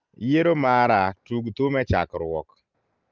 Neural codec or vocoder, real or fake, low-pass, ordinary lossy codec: none; real; 7.2 kHz; Opus, 24 kbps